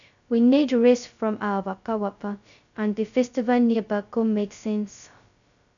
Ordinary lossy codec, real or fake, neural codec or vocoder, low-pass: none; fake; codec, 16 kHz, 0.2 kbps, FocalCodec; 7.2 kHz